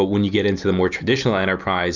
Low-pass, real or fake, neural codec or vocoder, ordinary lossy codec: 7.2 kHz; real; none; Opus, 64 kbps